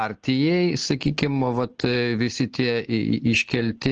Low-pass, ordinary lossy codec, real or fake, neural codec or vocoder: 7.2 kHz; Opus, 16 kbps; real; none